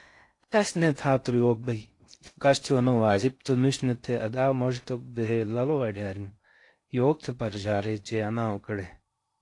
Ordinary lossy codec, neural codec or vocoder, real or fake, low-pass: AAC, 48 kbps; codec, 16 kHz in and 24 kHz out, 0.6 kbps, FocalCodec, streaming, 2048 codes; fake; 10.8 kHz